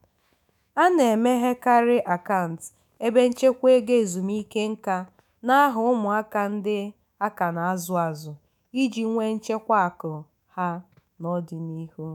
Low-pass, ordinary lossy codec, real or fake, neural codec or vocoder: 19.8 kHz; none; fake; autoencoder, 48 kHz, 128 numbers a frame, DAC-VAE, trained on Japanese speech